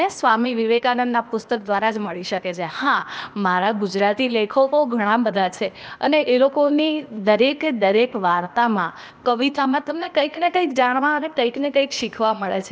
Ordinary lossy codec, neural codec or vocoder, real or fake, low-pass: none; codec, 16 kHz, 0.8 kbps, ZipCodec; fake; none